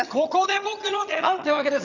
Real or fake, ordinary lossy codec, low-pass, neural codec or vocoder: fake; none; 7.2 kHz; vocoder, 22.05 kHz, 80 mel bands, HiFi-GAN